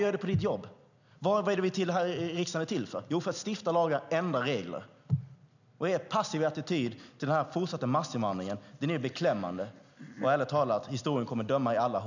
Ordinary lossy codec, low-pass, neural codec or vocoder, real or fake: none; 7.2 kHz; none; real